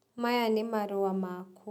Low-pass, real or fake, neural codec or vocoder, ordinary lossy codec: 19.8 kHz; real; none; none